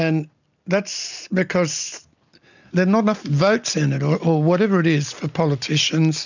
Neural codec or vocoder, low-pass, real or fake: none; 7.2 kHz; real